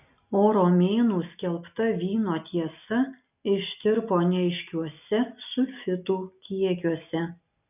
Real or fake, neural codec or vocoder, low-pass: real; none; 3.6 kHz